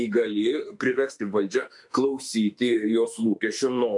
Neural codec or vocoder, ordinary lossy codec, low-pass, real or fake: autoencoder, 48 kHz, 32 numbers a frame, DAC-VAE, trained on Japanese speech; MP3, 96 kbps; 10.8 kHz; fake